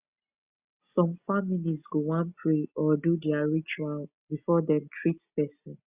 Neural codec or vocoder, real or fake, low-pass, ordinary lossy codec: none; real; 3.6 kHz; Opus, 64 kbps